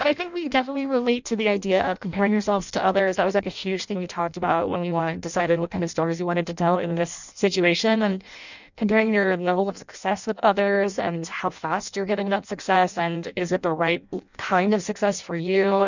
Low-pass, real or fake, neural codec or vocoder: 7.2 kHz; fake; codec, 16 kHz in and 24 kHz out, 0.6 kbps, FireRedTTS-2 codec